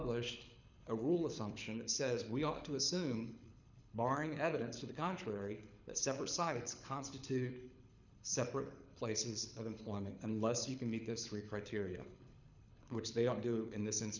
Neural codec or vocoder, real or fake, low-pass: codec, 24 kHz, 6 kbps, HILCodec; fake; 7.2 kHz